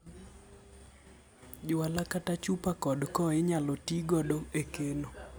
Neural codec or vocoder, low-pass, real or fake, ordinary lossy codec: none; none; real; none